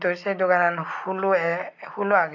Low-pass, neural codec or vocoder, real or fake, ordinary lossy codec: 7.2 kHz; none; real; none